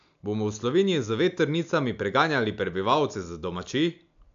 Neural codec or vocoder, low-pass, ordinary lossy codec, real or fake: none; 7.2 kHz; AAC, 96 kbps; real